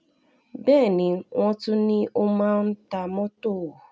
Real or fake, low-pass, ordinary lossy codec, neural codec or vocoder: real; none; none; none